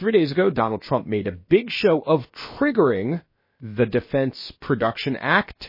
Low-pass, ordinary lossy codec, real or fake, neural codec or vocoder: 5.4 kHz; MP3, 24 kbps; fake; codec, 16 kHz, about 1 kbps, DyCAST, with the encoder's durations